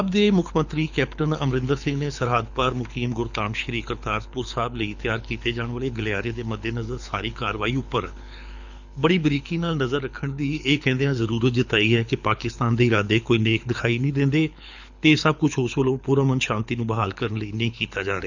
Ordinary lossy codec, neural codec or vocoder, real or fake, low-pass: none; codec, 24 kHz, 6 kbps, HILCodec; fake; 7.2 kHz